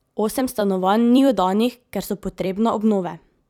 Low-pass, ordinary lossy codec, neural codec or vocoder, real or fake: 19.8 kHz; none; vocoder, 44.1 kHz, 128 mel bands every 256 samples, BigVGAN v2; fake